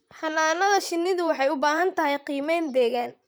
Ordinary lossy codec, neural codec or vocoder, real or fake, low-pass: none; vocoder, 44.1 kHz, 128 mel bands, Pupu-Vocoder; fake; none